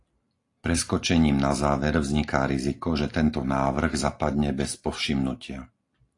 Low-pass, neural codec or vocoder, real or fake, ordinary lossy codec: 10.8 kHz; none; real; AAC, 48 kbps